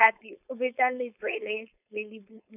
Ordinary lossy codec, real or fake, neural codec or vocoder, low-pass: none; fake; codec, 16 kHz, 4.8 kbps, FACodec; 3.6 kHz